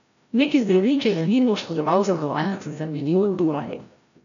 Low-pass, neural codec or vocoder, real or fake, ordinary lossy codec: 7.2 kHz; codec, 16 kHz, 0.5 kbps, FreqCodec, larger model; fake; none